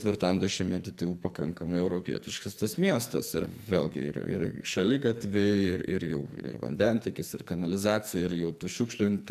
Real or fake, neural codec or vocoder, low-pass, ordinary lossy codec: fake; codec, 44.1 kHz, 2.6 kbps, SNAC; 14.4 kHz; MP3, 96 kbps